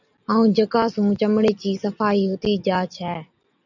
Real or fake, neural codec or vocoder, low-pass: real; none; 7.2 kHz